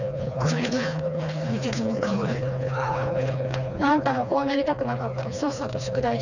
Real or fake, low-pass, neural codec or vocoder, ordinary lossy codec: fake; 7.2 kHz; codec, 16 kHz, 2 kbps, FreqCodec, smaller model; none